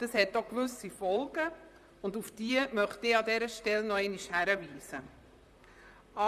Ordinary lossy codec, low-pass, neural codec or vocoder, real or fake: none; 14.4 kHz; vocoder, 44.1 kHz, 128 mel bands, Pupu-Vocoder; fake